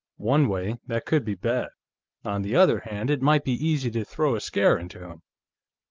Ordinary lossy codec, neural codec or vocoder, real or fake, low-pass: Opus, 32 kbps; none; real; 7.2 kHz